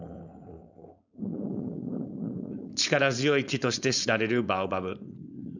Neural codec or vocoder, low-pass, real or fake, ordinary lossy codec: codec, 16 kHz, 4.8 kbps, FACodec; 7.2 kHz; fake; none